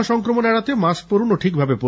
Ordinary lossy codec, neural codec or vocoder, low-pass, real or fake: none; none; none; real